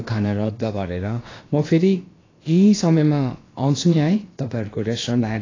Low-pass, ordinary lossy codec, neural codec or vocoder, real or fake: 7.2 kHz; AAC, 32 kbps; codec, 16 kHz, about 1 kbps, DyCAST, with the encoder's durations; fake